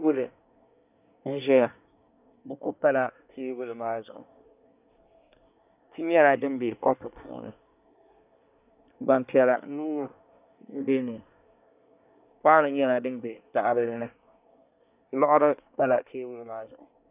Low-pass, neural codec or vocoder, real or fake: 3.6 kHz; codec, 24 kHz, 1 kbps, SNAC; fake